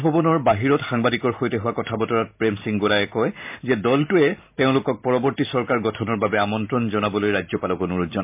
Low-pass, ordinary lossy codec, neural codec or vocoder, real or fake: 3.6 kHz; MP3, 32 kbps; none; real